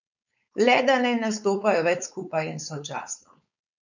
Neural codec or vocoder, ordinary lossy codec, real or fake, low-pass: codec, 16 kHz, 4.8 kbps, FACodec; none; fake; 7.2 kHz